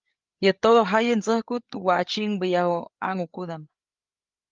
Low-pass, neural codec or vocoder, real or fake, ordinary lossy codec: 7.2 kHz; codec, 16 kHz, 8 kbps, FreqCodec, larger model; fake; Opus, 32 kbps